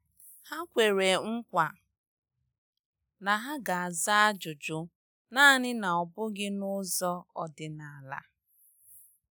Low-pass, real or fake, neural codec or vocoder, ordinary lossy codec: none; real; none; none